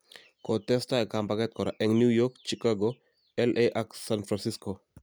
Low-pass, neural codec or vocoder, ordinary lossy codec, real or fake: none; none; none; real